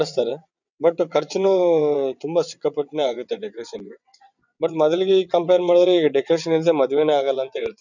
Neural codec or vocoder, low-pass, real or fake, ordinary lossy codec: vocoder, 22.05 kHz, 80 mel bands, WaveNeXt; 7.2 kHz; fake; none